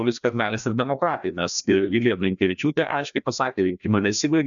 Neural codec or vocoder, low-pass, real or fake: codec, 16 kHz, 1 kbps, FreqCodec, larger model; 7.2 kHz; fake